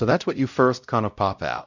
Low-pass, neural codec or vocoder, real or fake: 7.2 kHz; codec, 16 kHz, 0.5 kbps, X-Codec, WavLM features, trained on Multilingual LibriSpeech; fake